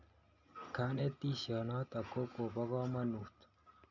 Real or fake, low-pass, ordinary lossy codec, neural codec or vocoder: real; 7.2 kHz; none; none